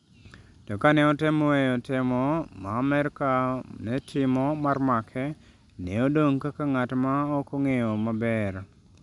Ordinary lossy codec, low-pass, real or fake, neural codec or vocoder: none; 10.8 kHz; real; none